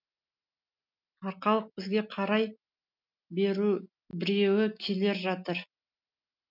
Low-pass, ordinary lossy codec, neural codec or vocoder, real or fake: 5.4 kHz; none; none; real